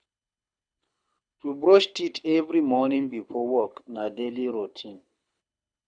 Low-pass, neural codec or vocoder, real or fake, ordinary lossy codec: 9.9 kHz; codec, 24 kHz, 6 kbps, HILCodec; fake; none